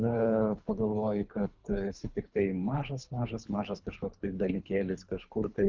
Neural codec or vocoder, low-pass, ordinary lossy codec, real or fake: codec, 24 kHz, 3 kbps, HILCodec; 7.2 kHz; Opus, 32 kbps; fake